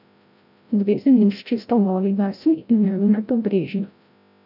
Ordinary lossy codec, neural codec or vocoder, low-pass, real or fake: none; codec, 16 kHz, 0.5 kbps, FreqCodec, larger model; 5.4 kHz; fake